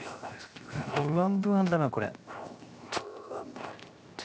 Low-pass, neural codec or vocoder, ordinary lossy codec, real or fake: none; codec, 16 kHz, 0.7 kbps, FocalCodec; none; fake